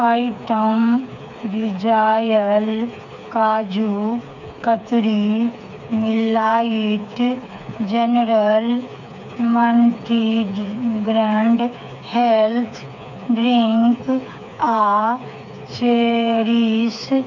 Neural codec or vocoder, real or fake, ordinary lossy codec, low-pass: codec, 16 kHz, 4 kbps, FreqCodec, smaller model; fake; none; 7.2 kHz